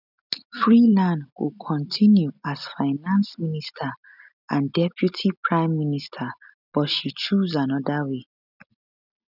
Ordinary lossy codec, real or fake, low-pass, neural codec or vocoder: none; real; 5.4 kHz; none